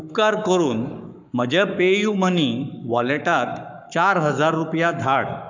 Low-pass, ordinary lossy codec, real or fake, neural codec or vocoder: 7.2 kHz; none; fake; codec, 44.1 kHz, 7.8 kbps, Pupu-Codec